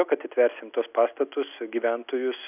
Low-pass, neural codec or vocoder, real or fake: 3.6 kHz; none; real